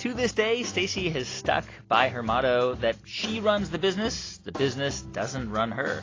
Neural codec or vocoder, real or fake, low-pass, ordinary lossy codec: none; real; 7.2 kHz; AAC, 32 kbps